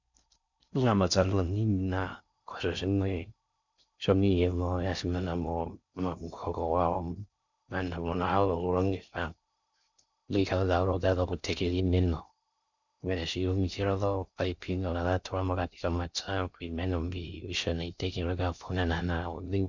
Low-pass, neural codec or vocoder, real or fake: 7.2 kHz; codec, 16 kHz in and 24 kHz out, 0.6 kbps, FocalCodec, streaming, 4096 codes; fake